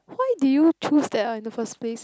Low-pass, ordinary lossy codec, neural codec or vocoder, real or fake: none; none; none; real